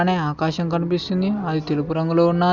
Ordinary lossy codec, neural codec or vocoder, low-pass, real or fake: Opus, 64 kbps; none; 7.2 kHz; real